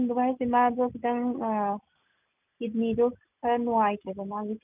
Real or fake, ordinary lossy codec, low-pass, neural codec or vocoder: real; none; 3.6 kHz; none